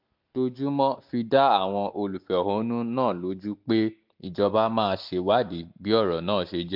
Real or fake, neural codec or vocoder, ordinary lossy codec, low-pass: real; none; MP3, 48 kbps; 5.4 kHz